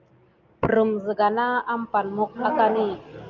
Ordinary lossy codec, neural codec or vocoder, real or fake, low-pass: Opus, 32 kbps; none; real; 7.2 kHz